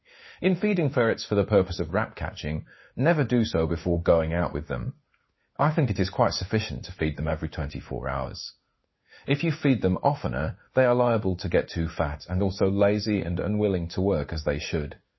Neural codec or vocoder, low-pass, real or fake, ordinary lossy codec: codec, 16 kHz in and 24 kHz out, 1 kbps, XY-Tokenizer; 7.2 kHz; fake; MP3, 24 kbps